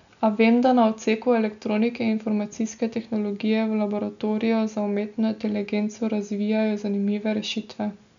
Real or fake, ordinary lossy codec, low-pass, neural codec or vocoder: real; none; 7.2 kHz; none